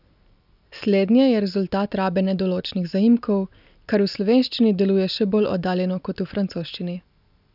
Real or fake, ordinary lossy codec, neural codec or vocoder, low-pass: real; none; none; 5.4 kHz